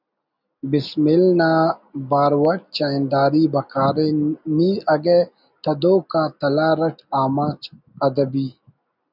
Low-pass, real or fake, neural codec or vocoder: 5.4 kHz; real; none